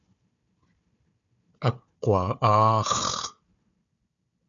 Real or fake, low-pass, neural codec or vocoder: fake; 7.2 kHz; codec, 16 kHz, 4 kbps, FunCodec, trained on Chinese and English, 50 frames a second